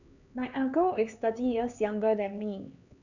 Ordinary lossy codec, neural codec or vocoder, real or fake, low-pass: none; codec, 16 kHz, 4 kbps, X-Codec, HuBERT features, trained on LibriSpeech; fake; 7.2 kHz